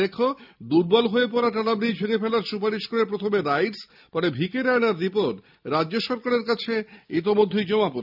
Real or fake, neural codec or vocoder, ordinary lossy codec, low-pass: real; none; none; 5.4 kHz